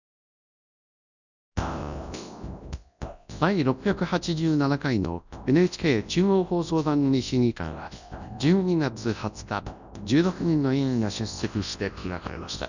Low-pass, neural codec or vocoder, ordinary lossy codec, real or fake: 7.2 kHz; codec, 24 kHz, 0.9 kbps, WavTokenizer, large speech release; none; fake